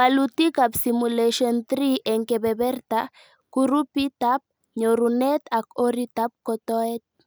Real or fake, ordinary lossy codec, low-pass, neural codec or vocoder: real; none; none; none